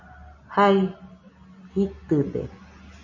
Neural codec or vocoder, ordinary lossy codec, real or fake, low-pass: none; MP3, 32 kbps; real; 7.2 kHz